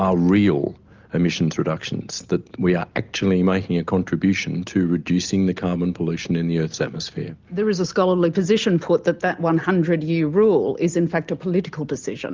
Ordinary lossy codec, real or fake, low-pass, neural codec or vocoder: Opus, 32 kbps; real; 7.2 kHz; none